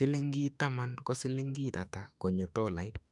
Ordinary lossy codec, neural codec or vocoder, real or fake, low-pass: none; autoencoder, 48 kHz, 32 numbers a frame, DAC-VAE, trained on Japanese speech; fake; 10.8 kHz